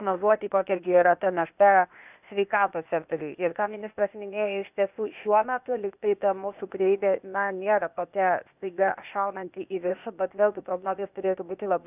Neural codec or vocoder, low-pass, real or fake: codec, 16 kHz, 0.8 kbps, ZipCodec; 3.6 kHz; fake